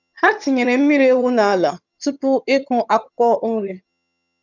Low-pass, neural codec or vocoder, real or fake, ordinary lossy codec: 7.2 kHz; vocoder, 22.05 kHz, 80 mel bands, HiFi-GAN; fake; none